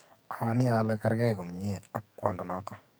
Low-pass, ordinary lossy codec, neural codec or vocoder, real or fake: none; none; codec, 44.1 kHz, 2.6 kbps, SNAC; fake